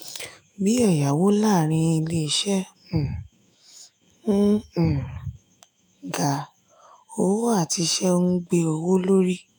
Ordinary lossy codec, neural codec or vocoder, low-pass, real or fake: none; autoencoder, 48 kHz, 128 numbers a frame, DAC-VAE, trained on Japanese speech; none; fake